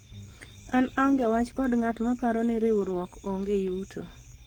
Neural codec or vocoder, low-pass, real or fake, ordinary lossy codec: codec, 44.1 kHz, 7.8 kbps, DAC; 19.8 kHz; fake; Opus, 16 kbps